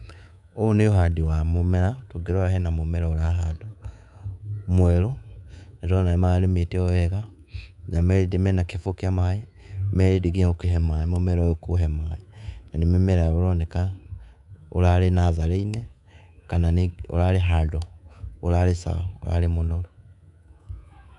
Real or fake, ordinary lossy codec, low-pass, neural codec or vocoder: fake; none; 10.8 kHz; codec, 24 kHz, 3.1 kbps, DualCodec